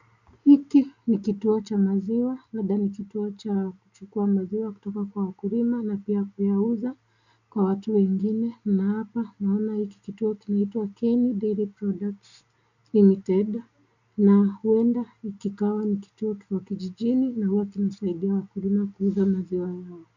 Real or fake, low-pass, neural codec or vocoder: real; 7.2 kHz; none